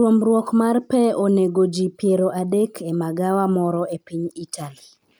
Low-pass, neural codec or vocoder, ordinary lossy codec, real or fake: none; none; none; real